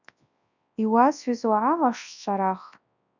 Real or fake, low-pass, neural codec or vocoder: fake; 7.2 kHz; codec, 24 kHz, 0.9 kbps, WavTokenizer, large speech release